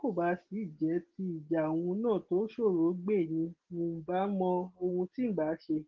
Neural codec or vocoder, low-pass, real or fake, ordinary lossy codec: none; 7.2 kHz; real; Opus, 16 kbps